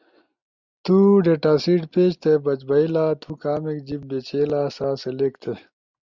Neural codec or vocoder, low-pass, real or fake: none; 7.2 kHz; real